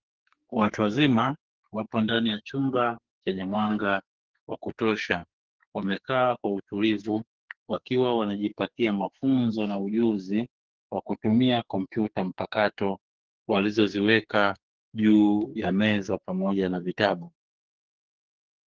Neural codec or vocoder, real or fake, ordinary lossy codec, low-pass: codec, 44.1 kHz, 2.6 kbps, SNAC; fake; Opus, 16 kbps; 7.2 kHz